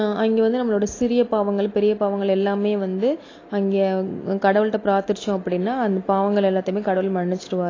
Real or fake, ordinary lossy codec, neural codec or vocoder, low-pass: real; AAC, 32 kbps; none; 7.2 kHz